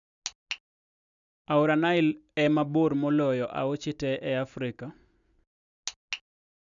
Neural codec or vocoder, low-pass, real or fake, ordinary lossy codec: none; 7.2 kHz; real; none